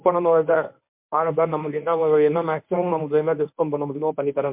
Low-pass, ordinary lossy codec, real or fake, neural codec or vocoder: 3.6 kHz; MP3, 32 kbps; fake; codec, 24 kHz, 0.9 kbps, WavTokenizer, medium speech release version 1